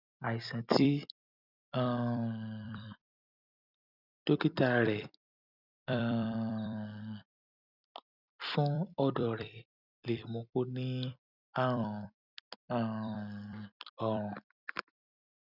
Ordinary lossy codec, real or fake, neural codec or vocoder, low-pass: none; fake; vocoder, 44.1 kHz, 128 mel bands every 256 samples, BigVGAN v2; 5.4 kHz